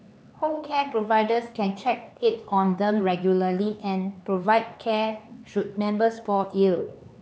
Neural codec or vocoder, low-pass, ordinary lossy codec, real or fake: codec, 16 kHz, 4 kbps, X-Codec, HuBERT features, trained on LibriSpeech; none; none; fake